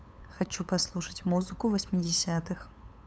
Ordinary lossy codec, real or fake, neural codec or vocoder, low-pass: none; fake; codec, 16 kHz, 8 kbps, FunCodec, trained on LibriTTS, 25 frames a second; none